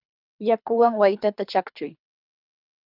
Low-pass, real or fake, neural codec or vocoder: 5.4 kHz; fake; codec, 24 kHz, 3 kbps, HILCodec